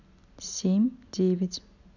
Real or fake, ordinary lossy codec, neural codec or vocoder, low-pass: real; none; none; 7.2 kHz